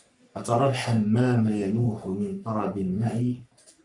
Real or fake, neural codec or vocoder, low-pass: fake; codec, 44.1 kHz, 3.4 kbps, Pupu-Codec; 10.8 kHz